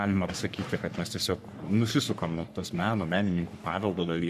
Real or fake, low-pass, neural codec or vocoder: fake; 14.4 kHz; codec, 44.1 kHz, 3.4 kbps, Pupu-Codec